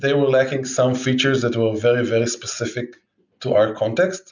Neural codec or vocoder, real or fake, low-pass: none; real; 7.2 kHz